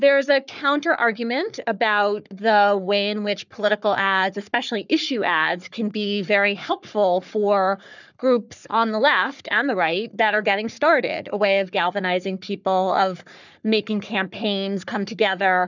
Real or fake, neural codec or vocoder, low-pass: fake; codec, 44.1 kHz, 3.4 kbps, Pupu-Codec; 7.2 kHz